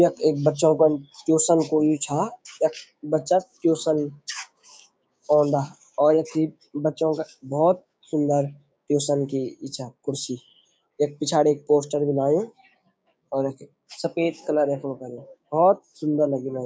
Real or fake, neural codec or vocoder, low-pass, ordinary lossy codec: fake; codec, 16 kHz, 6 kbps, DAC; none; none